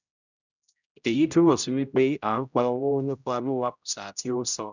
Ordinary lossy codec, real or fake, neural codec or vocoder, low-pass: none; fake; codec, 16 kHz, 0.5 kbps, X-Codec, HuBERT features, trained on general audio; 7.2 kHz